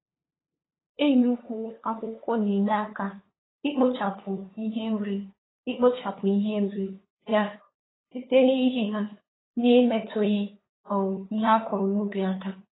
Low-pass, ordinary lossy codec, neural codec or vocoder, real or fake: 7.2 kHz; AAC, 16 kbps; codec, 16 kHz, 2 kbps, FunCodec, trained on LibriTTS, 25 frames a second; fake